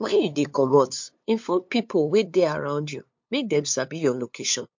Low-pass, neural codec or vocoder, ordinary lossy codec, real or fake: 7.2 kHz; codec, 16 kHz, 2 kbps, FunCodec, trained on LibriTTS, 25 frames a second; MP3, 48 kbps; fake